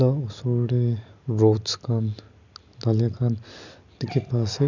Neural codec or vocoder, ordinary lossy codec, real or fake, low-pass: none; none; real; 7.2 kHz